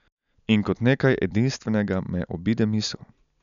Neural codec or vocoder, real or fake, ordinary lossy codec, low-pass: none; real; none; 7.2 kHz